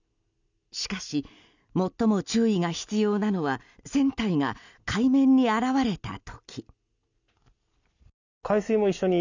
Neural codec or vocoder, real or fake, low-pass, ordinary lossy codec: none; real; 7.2 kHz; none